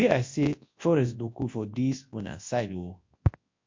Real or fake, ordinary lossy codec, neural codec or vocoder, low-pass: fake; MP3, 48 kbps; codec, 24 kHz, 0.9 kbps, WavTokenizer, large speech release; 7.2 kHz